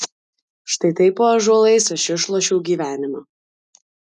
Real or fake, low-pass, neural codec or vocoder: real; 10.8 kHz; none